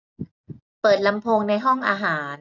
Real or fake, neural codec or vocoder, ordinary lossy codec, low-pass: real; none; none; 7.2 kHz